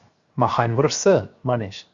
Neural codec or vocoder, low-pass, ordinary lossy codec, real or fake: codec, 16 kHz, 0.7 kbps, FocalCodec; 7.2 kHz; Opus, 64 kbps; fake